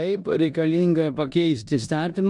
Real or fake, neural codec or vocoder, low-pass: fake; codec, 16 kHz in and 24 kHz out, 0.9 kbps, LongCat-Audio-Codec, four codebook decoder; 10.8 kHz